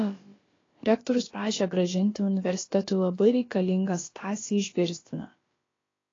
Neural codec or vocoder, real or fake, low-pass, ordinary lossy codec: codec, 16 kHz, about 1 kbps, DyCAST, with the encoder's durations; fake; 7.2 kHz; AAC, 32 kbps